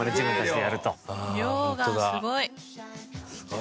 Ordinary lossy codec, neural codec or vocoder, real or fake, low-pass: none; none; real; none